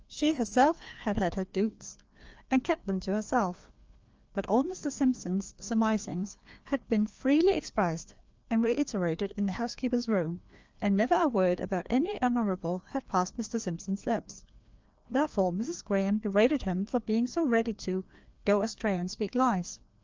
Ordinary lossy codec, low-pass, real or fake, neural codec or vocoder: Opus, 24 kbps; 7.2 kHz; fake; codec, 16 kHz, 2 kbps, FreqCodec, larger model